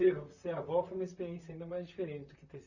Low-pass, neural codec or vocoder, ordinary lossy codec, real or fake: 7.2 kHz; vocoder, 44.1 kHz, 128 mel bands, Pupu-Vocoder; none; fake